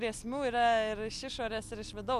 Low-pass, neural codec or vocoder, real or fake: 14.4 kHz; none; real